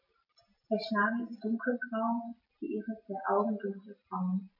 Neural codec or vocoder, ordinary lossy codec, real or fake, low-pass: none; MP3, 32 kbps; real; 5.4 kHz